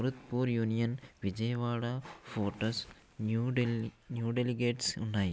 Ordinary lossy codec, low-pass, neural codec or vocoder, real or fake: none; none; none; real